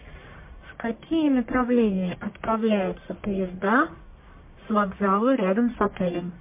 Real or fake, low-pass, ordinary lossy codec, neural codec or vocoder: fake; 3.6 kHz; MP3, 24 kbps; codec, 44.1 kHz, 1.7 kbps, Pupu-Codec